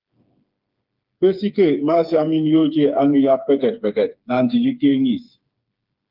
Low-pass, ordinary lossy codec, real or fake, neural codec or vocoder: 5.4 kHz; Opus, 32 kbps; fake; codec, 16 kHz, 4 kbps, FreqCodec, smaller model